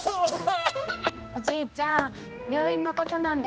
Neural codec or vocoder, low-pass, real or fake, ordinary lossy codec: codec, 16 kHz, 1 kbps, X-Codec, HuBERT features, trained on general audio; none; fake; none